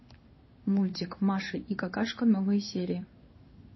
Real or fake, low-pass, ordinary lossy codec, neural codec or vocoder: fake; 7.2 kHz; MP3, 24 kbps; codec, 16 kHz in and 24 kHz out, 1 kbps, XY-Tokenizer